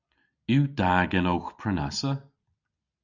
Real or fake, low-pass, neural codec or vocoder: real; 7.2 kHz; none